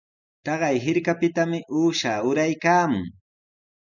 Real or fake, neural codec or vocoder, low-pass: real; none; 7.2 kHz